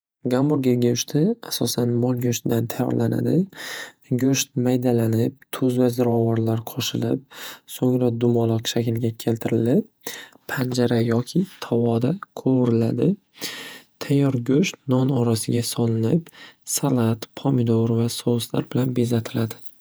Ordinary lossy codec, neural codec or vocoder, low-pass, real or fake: none; vocoder, 48 kHz, 128 mel bands, Vocos; none; fake